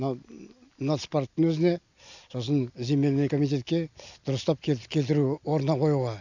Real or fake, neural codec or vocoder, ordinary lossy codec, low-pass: real; none; none; 7.2 kHz